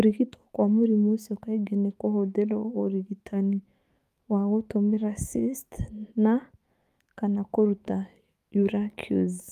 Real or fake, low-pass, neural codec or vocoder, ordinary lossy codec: fake; 14.4 kHz; autoencoder, 48 kHz, 128 numbers a frame, DAC-VAE, trained on Japanese speech; Opus, 32 kbps